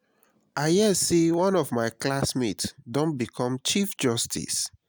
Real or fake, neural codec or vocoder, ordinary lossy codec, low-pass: real; none; none; none